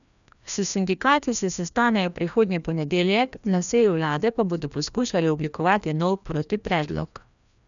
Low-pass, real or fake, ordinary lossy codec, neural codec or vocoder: 7.2 kHz; fake; none; codec, 16 kHz, 1 kbps, FreqCodec, larger model